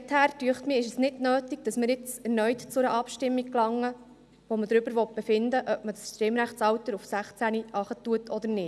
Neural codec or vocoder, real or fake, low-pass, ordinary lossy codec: none; real; none; none